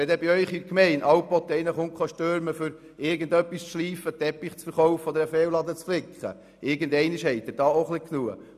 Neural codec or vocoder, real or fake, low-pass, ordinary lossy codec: none; real; 14.4 kHz; none